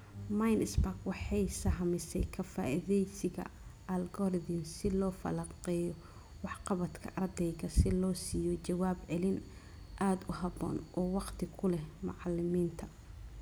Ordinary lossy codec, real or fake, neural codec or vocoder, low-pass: none; real; none; none